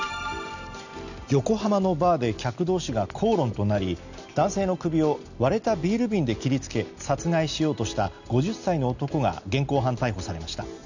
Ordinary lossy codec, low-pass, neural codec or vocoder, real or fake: none; 7.2 kHz; none; real